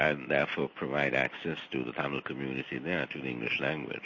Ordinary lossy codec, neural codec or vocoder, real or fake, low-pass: MP3, 32 kbps; none; real; 7.2 kHz